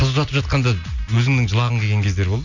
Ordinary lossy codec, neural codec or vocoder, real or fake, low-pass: none; none; real; 7.2 kHz